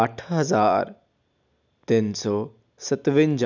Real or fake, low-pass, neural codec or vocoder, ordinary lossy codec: real; 7.2 kHz; none; none